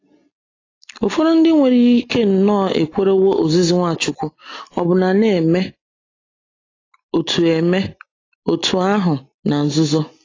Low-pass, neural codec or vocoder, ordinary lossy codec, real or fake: 7.2 kHz; none; AAC, 32 kbps; real